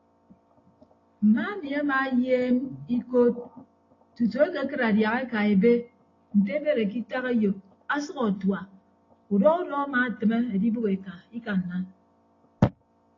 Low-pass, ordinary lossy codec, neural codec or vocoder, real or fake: 7.2 kHz; AAC, 32 kbps; none; real